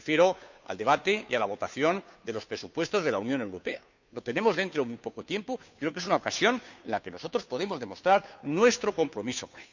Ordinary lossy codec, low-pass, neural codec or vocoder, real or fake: none; 7.2 kHz; codec, 16 kHz, 2 kbps, FunCodec, trained on Chinese and English, 25 frames a second; fake